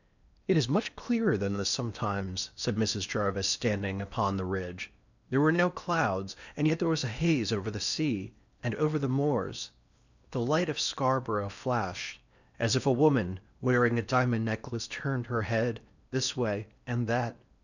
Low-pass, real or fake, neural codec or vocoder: 7.2 kHz; fake; codec, 16 kHz in and 24 kHz out, 0.8 kbps, FocalCodec, streaming, 65536 codes